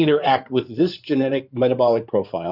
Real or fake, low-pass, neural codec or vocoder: fake; 5.4 kHz; codec, 16 kHz in and 24 kHz out, 2.2 kbps, FireRedTTS-2 codec